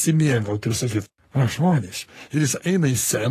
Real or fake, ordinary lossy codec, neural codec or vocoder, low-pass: fake; AAC, 48 kbps; codec, 44.1 kHz, 3.4 kbps, Pupu-Codec; 14.4 kHz